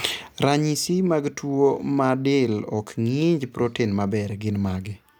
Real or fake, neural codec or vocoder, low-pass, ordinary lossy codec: real; none; none; none